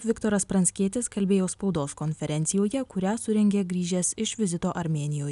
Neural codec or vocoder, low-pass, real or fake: none; 10.8 kHz; real